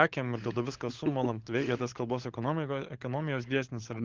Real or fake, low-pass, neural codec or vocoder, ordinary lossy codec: fake; 7.2 kHz; codec, 16 kHz, 16 kbps, FunCodec, trained on LibriTTS, 50 frames a second; Opus, 16 kbps